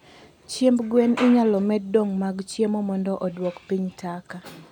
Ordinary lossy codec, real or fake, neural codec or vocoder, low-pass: none; real; none; 19.8 kHz